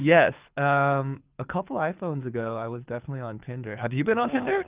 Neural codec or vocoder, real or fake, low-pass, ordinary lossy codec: codec, 24 kHz, 6 kbps, HILCodec; fake; 3.6 kHz; Opus, 32 kbps